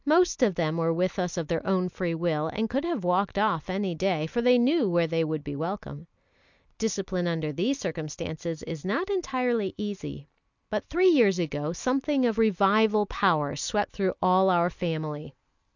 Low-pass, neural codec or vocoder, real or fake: 7.2 kHz; none; real